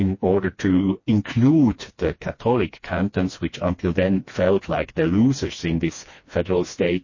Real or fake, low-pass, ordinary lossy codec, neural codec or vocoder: fake; 7.2 kHz; MP3, 32 kbps; codec, 16 kHz, 2 kbps, FreqCodec, smaller model